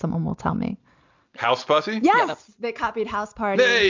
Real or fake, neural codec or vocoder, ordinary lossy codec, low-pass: real; none; MP3, 64 kbps; 7.2 kHz